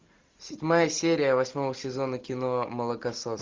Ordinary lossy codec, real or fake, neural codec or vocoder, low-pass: Opus, 24 kbps; real; none; 7.2 kHz